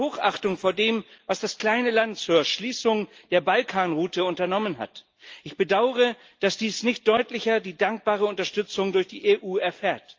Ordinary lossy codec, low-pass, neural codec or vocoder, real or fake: Opus, 24 kbps; 7.2 kHz; none; real